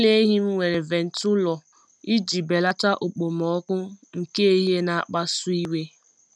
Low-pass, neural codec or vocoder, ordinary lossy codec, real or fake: none; none; none; real